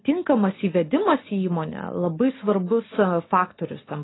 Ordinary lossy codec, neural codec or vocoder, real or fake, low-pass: AAC, 16 kbps; none; real; 7.2 kHz